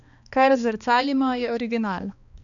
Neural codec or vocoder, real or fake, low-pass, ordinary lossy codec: codec, 16 kHz, 2 kbps, X-Codec, HuBERT features, trained on balanced general audio; fake; 7.2 kHz; none